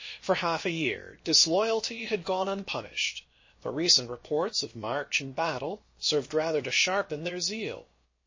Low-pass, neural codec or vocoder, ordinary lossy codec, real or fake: 7.2 kHz; codec, 16 kHz, about 1 kbps, DyCAST, with the encoder's durations; MP3, 32 kbps; fake